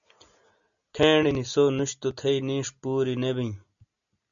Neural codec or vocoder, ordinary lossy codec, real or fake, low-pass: none; MP3, 96 kbps; real; 7.2 kHz